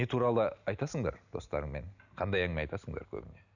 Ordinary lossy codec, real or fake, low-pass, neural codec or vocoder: none; real; 7.2 kHz; none